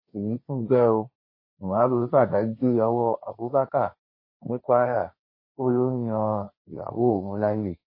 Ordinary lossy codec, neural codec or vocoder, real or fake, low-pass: MP3, 24 kbps; codec, 16 kHz, 1.1 kbps, Voila-Tokenizer; fake; 5.4 kHz